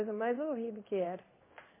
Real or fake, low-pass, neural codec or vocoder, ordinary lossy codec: fake; 3.6 kHz; codec, 16 kHz in and 24 kHz out, 1 kbps, XY-Tokenizer; MP3, 24 kbps